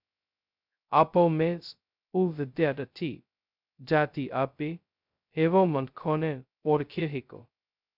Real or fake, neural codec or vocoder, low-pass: fake; codec, 16 kHz, 0.2 kbps, FocalCodec; 5.4 kHz